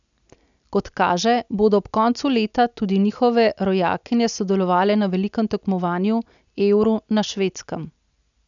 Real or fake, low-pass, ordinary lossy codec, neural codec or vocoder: real; 7.2 kHz; none; none